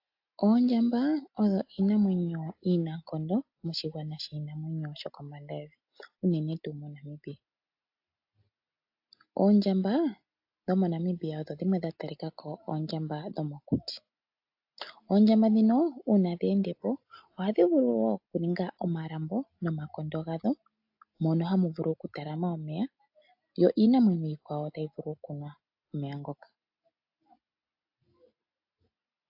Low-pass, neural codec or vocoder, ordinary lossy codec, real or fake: 5.4 kHz; none; AAC, 48 kbps; real